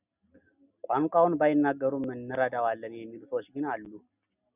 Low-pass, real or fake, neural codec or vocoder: 3.6 kHz; real; none